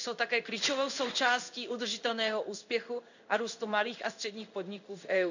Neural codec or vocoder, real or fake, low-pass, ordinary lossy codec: codec, 16 kHz in and 24 kHz out, 1 kbps, XY-Tokenizer; fake; 7.2 kHz; none